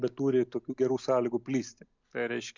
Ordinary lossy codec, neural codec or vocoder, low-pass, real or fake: MP3, 64 kbps; none; 7.2 kHz; real